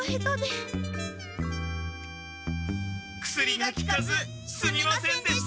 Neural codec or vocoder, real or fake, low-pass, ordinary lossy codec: none; real; none; none